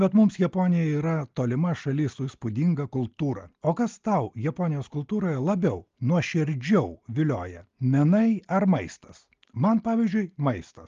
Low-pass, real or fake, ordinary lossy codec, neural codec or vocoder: 7.2 kHz; real; Opus, 32 kbps; none